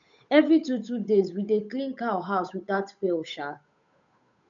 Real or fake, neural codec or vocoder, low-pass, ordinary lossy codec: fake; codec, 16 kHz, 8 kbps, FunCodec, trained on Chinese and English, 25 frames a second; 7.2 kHz; none